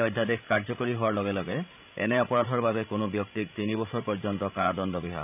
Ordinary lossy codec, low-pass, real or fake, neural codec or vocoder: none; 3.6 kHz; fake; codec, 16 kHz, 16 kbps, FreqCodec, larger model